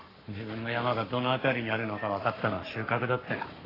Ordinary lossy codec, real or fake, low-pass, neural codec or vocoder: AAC, 24 kbps; fake; 5.4 kHz; codec, 44.1 kHz, 7.8 kbps, Pupu-Codec